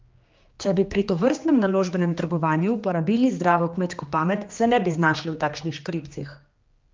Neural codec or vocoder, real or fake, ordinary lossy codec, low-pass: codec, 16 kHz, 2 kbps, X-Codec, HuBERT features, trained on general audio; fake; Opus, 24 kbps; 7.2 kHz